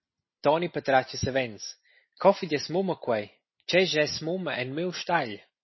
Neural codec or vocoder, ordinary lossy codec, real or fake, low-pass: none; MP3, 24 kbps; real; 7.2 kHz